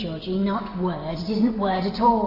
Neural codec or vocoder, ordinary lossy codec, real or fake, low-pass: none; AAC, 32 kbps; real; 5.4 kHz